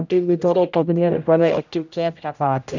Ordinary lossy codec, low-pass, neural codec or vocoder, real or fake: none; 7.2 kHz; codec, 16 kHz, 0.5 kbps, X-Codec, HuBERT features, trained on general audio; fake